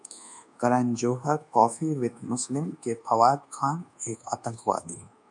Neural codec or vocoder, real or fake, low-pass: codec, 24 kHz, 1.2 kbps, DualCodec; fake; 10.8 kHz